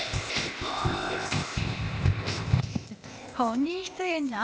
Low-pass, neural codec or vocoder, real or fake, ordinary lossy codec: none; codec, 16 kHz, 0.8 kbps, ZipCodec; fake; none